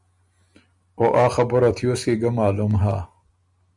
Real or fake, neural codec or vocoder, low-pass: real; none; 10.8 kHz